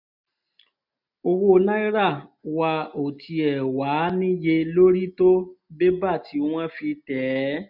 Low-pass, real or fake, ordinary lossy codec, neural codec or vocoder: 5.4 kHz; real; none; none